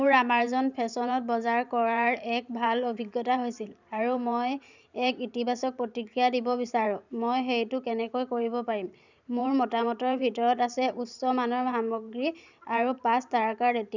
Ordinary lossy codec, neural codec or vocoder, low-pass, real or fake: none; vocoder, 44.1 kHz, 128 mel bands every 512 samples, BigVGAN v2; 7.2 kHz; fake